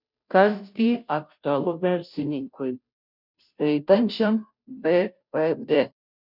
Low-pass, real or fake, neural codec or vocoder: 5.4 kHz; fake; codec, 16 kHz, 0.5 kbps, FunCodec, trained on Chinese and English, 25 frames a second